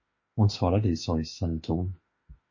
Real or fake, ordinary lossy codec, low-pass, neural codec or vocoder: fake; MP3, 32 kbps; 7.2 kHz; autoencoder, 48 kHz, 32 numbers a frame, DAC-VAE, trained on Japanese speech